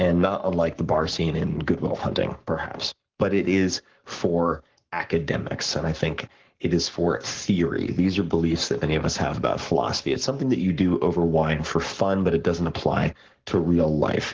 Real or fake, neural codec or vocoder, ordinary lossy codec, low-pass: fake; codec, 44.1 kHz, 7.8 kbps, Pupu-Codec; Opus, 16 kbps; 7.2 kHz